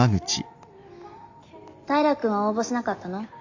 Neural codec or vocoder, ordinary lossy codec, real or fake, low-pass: none; AAC, 48 kbps; real; 7.2 kHz